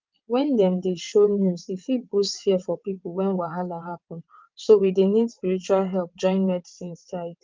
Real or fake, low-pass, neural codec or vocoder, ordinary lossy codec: fake; 7.2 kHz; vocoder, 22.05 kHz, 80 mel bands, WaveNeXt; Opus, 24 kbps